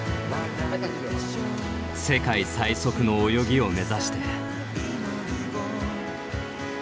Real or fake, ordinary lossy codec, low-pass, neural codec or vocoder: real; none; none; none